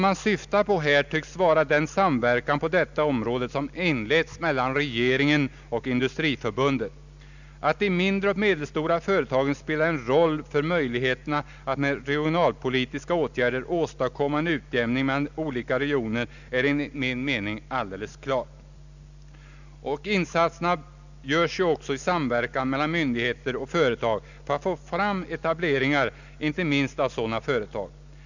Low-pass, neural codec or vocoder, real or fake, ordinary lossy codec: 7.2 kHz; none; real; none